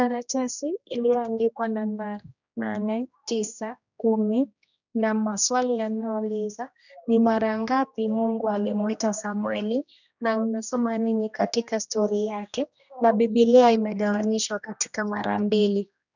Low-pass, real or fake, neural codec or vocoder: 7.2 kHz; fake; codec, 16 kHz, 1 kbps, X-Codec, HuBERT features, trained on general audio